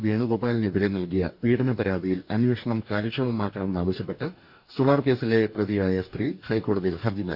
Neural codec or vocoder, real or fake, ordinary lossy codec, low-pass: codec, 44.1 kHz, 2.6 kbps, DAC; fake; none; 5.4 kHz